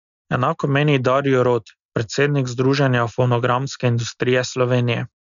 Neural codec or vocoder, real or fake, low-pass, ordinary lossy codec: none; real; 7.2 kHz; none